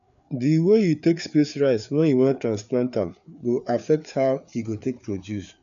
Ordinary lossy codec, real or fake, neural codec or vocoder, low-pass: none; fake; codec, 16 kHz, 4 kbps, FreqCodec, larger model; 7.2 kHz